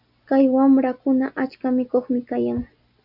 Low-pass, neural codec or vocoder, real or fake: 5.4 kHz; none; real